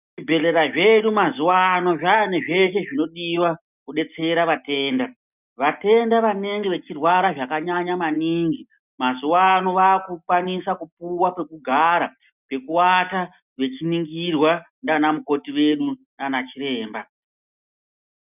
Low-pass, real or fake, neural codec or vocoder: 3.6 kHz; real; none